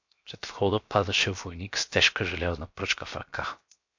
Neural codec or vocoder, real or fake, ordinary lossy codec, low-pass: codec, 16 kHz, 0.7 kbps, FocalCodec; fake; MP3, 48 kbps; 7.2 kHz